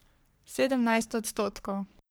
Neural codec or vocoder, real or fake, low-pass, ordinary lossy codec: codec, 44.1 kHz, 3.4 kbps, Pupu-Codec; fake; none; none